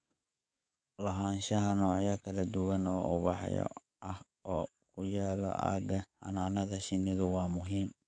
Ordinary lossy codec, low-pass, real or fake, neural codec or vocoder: MP3, 96 kbps; 9.9 kHz; fake; codec, 44.1 kHz, 7.8 kbps, DAC